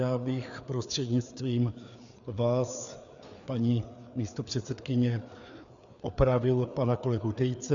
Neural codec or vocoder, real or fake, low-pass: codec, 16 kHz, 4 kbps, FreqCodec, larger model; fake; 7.2 kHz